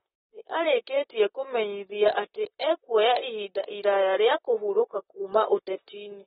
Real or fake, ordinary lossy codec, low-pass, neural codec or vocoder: fake; AAC, 16 kbps; 19.8 kHz; vocoder, 44.1 kHz, 128 mel bands, Pupu-Vocoder